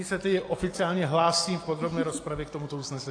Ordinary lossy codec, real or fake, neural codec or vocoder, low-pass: AAC, 48 kbps; real; none; 9.9 kHz